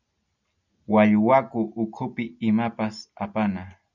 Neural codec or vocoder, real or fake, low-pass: none; real; 7.2 kHz